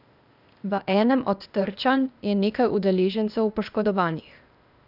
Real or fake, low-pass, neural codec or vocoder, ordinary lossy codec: fake; 5.4 kHz; codec, 16 kHz, 0.8 kbps, ZipCodec; none